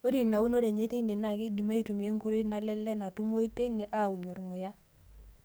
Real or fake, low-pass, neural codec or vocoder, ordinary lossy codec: fake; none; codec, 44.1 kHz, 2.6 kbps, SNAC; none